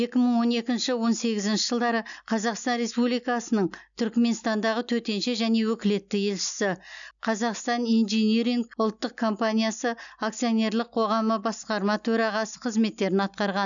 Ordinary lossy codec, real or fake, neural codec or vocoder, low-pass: none; real; none; 7.2 kHz